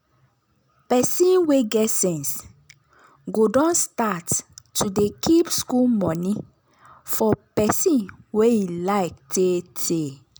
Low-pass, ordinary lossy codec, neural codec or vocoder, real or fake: none; none; none; real